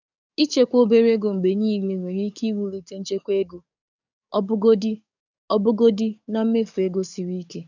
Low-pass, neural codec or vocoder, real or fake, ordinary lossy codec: 7.2 kHz; codec, 44.1 kHz, 7.8 kbps, DAC; fake; none